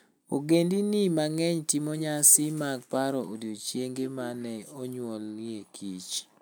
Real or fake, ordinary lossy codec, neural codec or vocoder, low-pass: real; none; none; none